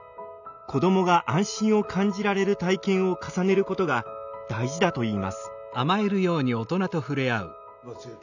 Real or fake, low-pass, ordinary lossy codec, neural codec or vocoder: real; 7.2 kHz; none; none